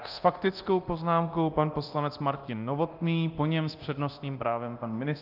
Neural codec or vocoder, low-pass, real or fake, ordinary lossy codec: codec, 24 kHz, 0.9 kbps, DualCodec; 5.4 kHz; fake; Opus, 32 kbps